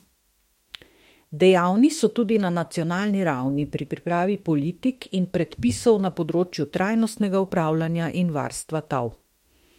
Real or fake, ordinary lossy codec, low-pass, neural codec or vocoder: fake; MP3, 64 kbps; 19.8 kHz; autoencoder, 48 kHz, 32 numbers a frame, DAC-VAE, trained on Japanese speech